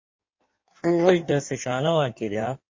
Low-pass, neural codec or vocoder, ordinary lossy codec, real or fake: 7.2 kHz; codec, 16 kHz in and 24 kHz out, 1.1 kbps, FireRedTTS-2 codec; MP3, 32 kbps; fake